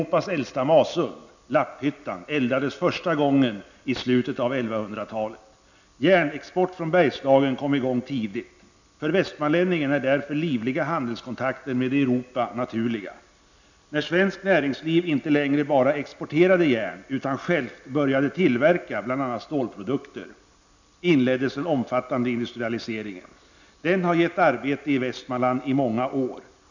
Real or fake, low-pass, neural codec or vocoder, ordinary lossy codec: real; 7.2 kHz; none; none